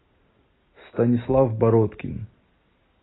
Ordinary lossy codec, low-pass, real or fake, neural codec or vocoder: AAC, 16 kbps; 7.2 kHz; real; none